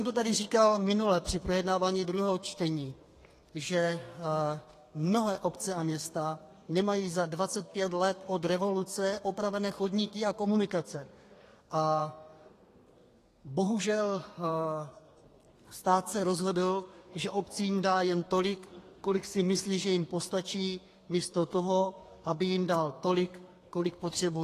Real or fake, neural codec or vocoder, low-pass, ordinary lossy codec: fake; codec, 32 kHz, 1.9 kbps, SNAC; 14.4 kHz; AAC, 48 kbps